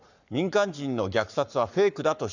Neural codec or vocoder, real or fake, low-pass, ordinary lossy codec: codec, 44.1 kHz, 7.8 kbps, Pupu-Codec; fake; 7.2 kHz; none